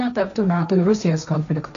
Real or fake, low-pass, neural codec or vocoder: fake; 7.2 kHz; codec, 16 kHz, 1.1 kbps, Voila-Tokenizer